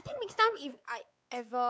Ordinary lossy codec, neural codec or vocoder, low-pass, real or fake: none; codec, 16 kHz, 4 kbps, X-Codec, WavLM features, trained on Multilingual LibriSpeech; none; fake